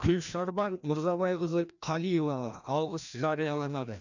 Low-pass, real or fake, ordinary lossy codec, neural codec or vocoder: 7.2 kHz; fake; none; codec, 16 kHz, 1 kbps, FreqCodec, larger model